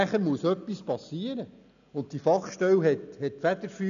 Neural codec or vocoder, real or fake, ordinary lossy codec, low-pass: none; real; none; 7.2 kHz